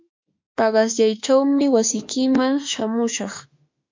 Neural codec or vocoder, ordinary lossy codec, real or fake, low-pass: autoencoder, 48 kHz, 32 numbers a frame, DAC-VAE, trained on Japanese speech; MP3, 48 kbps; fake; 7.2 kHz